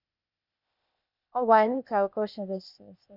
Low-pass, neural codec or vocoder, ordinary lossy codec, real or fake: 5.4 kHz; codec, 16 kHz, 0.8 kbps, ZipCodec; none; fake